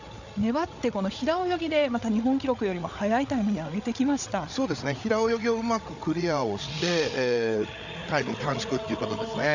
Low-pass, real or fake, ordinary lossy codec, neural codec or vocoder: 7.2 kHz; fake; none; codec, 16 kHz, 8 kbps, FreqCodec, larger model